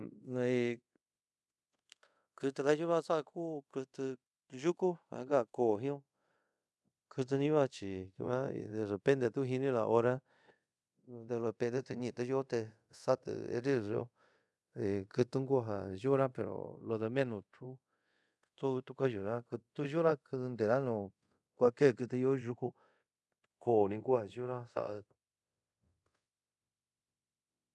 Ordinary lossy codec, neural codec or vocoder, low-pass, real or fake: none; codec, 24 kHz, 0.5 kbps, DualCodec; none; fake